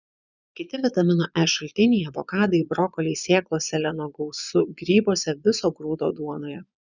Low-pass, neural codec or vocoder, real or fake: 7.2 kHz; vocoder, 22.05 kHz, 80 mel bands, Vocos; fake